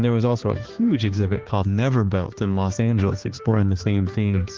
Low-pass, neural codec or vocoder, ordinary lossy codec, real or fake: 7.2 kHz; codec, 16 kHz, 2 kbps, X-Codec, HuBERT features, trained on balanced general audio; Opus, 16 kbps; fake